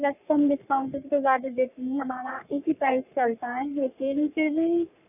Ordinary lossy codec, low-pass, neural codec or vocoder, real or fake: none; 3.6 kHz; codec, 44.1 kHz, 3.4 kbps, Pupu-Codec; fake